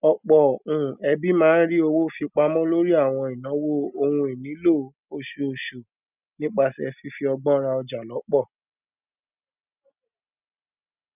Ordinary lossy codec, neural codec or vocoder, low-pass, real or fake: none; none; 3.6 kHz; real